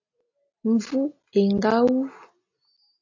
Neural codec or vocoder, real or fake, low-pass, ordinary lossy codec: none; real; 7.2 kHz; AAC, 48 kbps